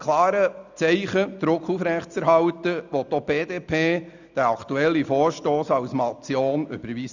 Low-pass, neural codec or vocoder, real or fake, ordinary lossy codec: 7.2 kHz; none; real; none